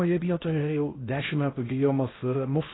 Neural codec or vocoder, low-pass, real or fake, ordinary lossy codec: codec, 16 kHz in and 24 kHz out, 0.6 kbps, FocalCodec, streaming, 4096 codes; 7.2 kHz; fake; AAC, 16 kbps